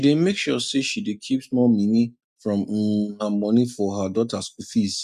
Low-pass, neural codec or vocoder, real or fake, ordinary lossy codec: 14.4 kHz; none; real; none